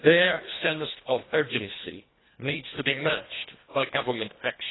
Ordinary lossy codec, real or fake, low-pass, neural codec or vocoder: AAC, 16 kbps; fake; 7.2 kHz; codec, 24 kHz, 1.5 kbps, HILCodec